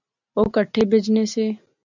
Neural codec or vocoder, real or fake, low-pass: none; real; 7.2 kHz